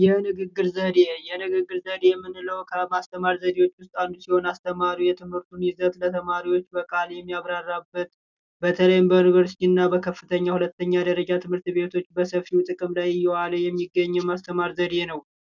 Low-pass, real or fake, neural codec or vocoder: 7.2 kHz; real; none